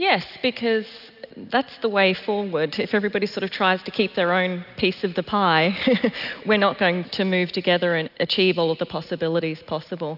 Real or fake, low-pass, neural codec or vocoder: real; 5.4 kHz; none